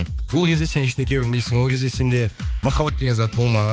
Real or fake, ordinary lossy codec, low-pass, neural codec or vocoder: fake; none; none; codec, 16 kHz, 2 kbps, X-Codec, HuBERT features, trained on balanced general audio